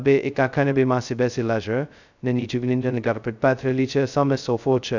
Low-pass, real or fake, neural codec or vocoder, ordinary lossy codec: 7.2 kHz; fake; codec, 16 kHz, 0.2 kbps, FocalCodec; none